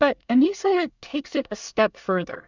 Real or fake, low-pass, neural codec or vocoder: fake; 7.2 kHz; codec, 24 kHz, 1 kbps, SNAC